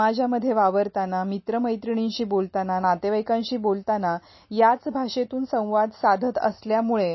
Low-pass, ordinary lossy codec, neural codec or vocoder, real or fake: 7.2 kHz; MP3, 24 kbps; none; real